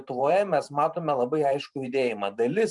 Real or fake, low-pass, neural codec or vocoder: fake; 10.8 kHz; vocoder, 44.1 kHz, 128 mel bands every 512 samples, BigVGAN v2